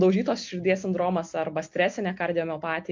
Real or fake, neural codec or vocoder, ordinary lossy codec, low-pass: real; none; MP3, 48 kbps; 7.2 kHz